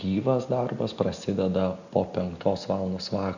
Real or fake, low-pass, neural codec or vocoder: real; 7.2 kHz; none